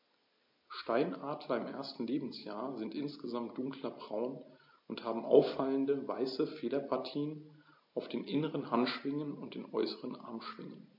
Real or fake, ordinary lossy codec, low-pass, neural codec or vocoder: real; MP3, 32 kbps; 5.4 kHz; none